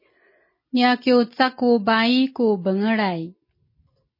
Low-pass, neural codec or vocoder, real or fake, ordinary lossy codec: 5.4 kHz; none; real; MP3, 24 kbps